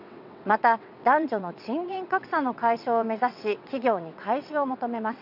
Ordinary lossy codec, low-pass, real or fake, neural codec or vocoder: none; 5.4 kHz; fake; vocoder, 22.05 kHz, 80 mel bands, WaveNeXt